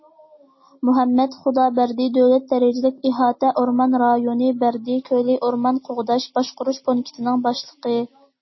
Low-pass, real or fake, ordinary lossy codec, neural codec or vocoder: 7.2 kHz; real; MP3, 24 kbps; none